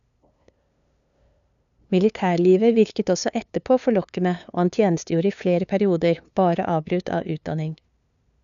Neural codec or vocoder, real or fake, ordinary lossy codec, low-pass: codec, 16 kHz, 2 kbps, FunCodec, trained on LibriTTS, 25 frames a second; fake; none; 7.2 kHz